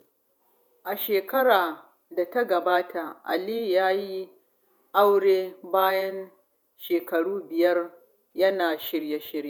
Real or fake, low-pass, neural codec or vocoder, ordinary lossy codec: fake; 19.8 kHz; vocoder, 48 kHz, 128 mel bands, Vocos; none